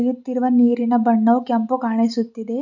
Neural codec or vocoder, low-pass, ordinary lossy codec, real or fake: none; 7.2 kHz; none; real